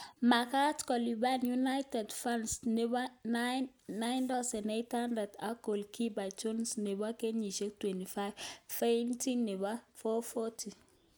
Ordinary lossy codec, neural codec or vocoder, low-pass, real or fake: none; none; none; real